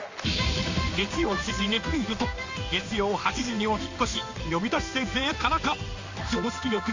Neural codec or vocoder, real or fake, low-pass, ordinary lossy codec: codec, 16 kHz in and 24 kHz out, 1 kbps, XY-Tokenizer; fake; 7.2 kHz; AAC, 48 kbps